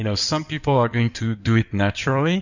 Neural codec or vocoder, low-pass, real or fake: codec, 16 kHz in and 24 kHz out, 2.2 kbps, FireRedTTS-2 codec; 7.2 kHz; fake